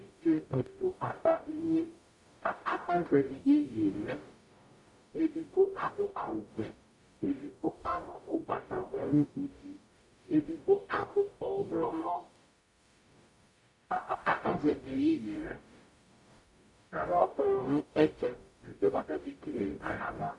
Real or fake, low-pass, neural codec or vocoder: fake; 10.8 kHz; codec, 44.1 kHz, 0.9 kbps, DAC